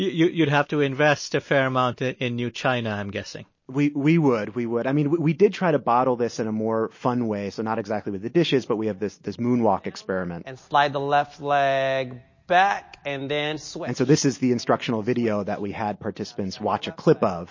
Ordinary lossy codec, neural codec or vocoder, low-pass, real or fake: MP3, 32 kbps; none; 7.2 kHz; real